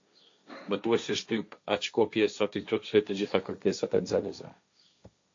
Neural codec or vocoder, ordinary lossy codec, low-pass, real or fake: codec, 16 kHz, 1.1 kbps, Voila-Tokenizer; AAC, 48 kbps; 7.2 kHz; fake